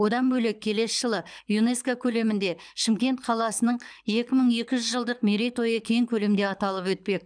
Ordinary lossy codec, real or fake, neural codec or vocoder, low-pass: none; fake; codec, 24 kHz, 6 kbps, HILCodec; 9.9 kHz